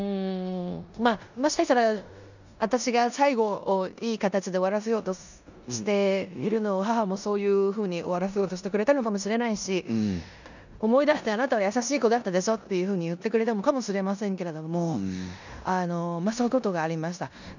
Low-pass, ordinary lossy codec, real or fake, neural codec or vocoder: 7.2 kHz; none; fake; codec, 16 kHz in and 24 kHz out, 0.9 kbps, LongCat-Audio-Codec, four codebook decoder